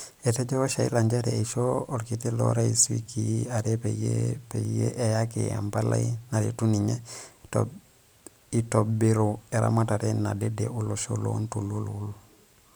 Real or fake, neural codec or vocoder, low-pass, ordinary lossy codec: fake; vocoder, 44.1 kHz, 128 mel bands every 512 samples, BigVGAN v2; none; none